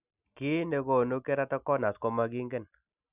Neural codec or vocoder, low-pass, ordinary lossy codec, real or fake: none; 3.6 kHz; none; real